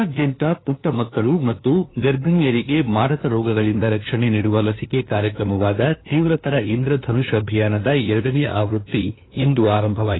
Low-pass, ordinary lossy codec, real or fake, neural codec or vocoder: 7.2 kHz; AAC, 16 kbps; fake; codec, 16 kHz, 2 kbps, FunCodec, trained on LibriTTS, 25 frames a second